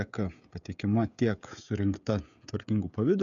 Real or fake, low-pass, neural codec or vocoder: fake; 7.2 kHz; codec, 16 kHz, 16 kbps, FreqCodec, smaller model